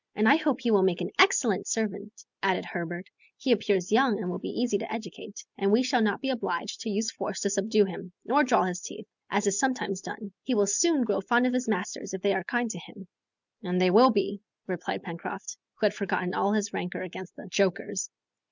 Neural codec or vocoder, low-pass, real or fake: none; 7.2 kHz; real